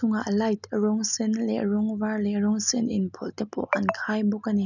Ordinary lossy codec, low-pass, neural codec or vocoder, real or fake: none; 7.2 kHz; none; real